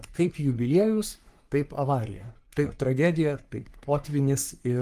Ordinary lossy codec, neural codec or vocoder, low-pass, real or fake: Opus, 32 kbps; codec, 44.1 kHz, 3.4 kbps, Pupu-Codec; 14.4 kHz; fake